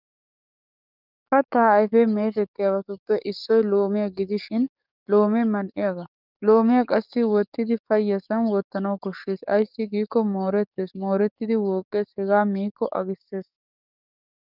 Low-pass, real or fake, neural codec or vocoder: 5.4 kHz; fake; codec, 44.1 kHz, 7.8 kbps, DAC